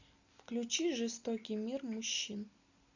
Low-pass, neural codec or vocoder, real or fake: 7.2 kHz; none; real